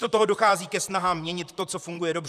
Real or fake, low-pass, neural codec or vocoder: fake; 14.4 kHz; vocoder, 44.1 kHz, 128 mel bands, Pupu-Vocoder